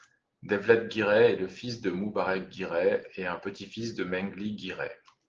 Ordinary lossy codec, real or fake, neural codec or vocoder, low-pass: Opus, 16 kbps; real; none; 7.2 kHz